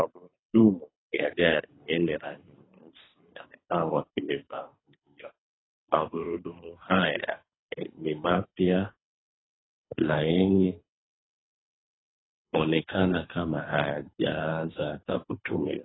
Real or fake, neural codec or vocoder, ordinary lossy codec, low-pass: fake; codec, 24 kHz, 3 kbps, HILCodec; AAC, 16 kbps; 7.2 kHz